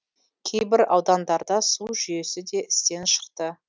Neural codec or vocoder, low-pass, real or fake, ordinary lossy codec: none; none; real; none